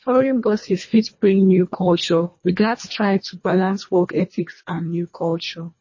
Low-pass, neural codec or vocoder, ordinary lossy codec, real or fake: 7.2 kHz; codec, 24 kHz, 1.5 kbps, HILCodec; MP3, 32 kbps; fake